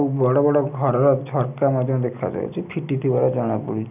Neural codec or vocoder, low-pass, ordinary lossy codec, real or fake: none; 3.6 kHz; none; real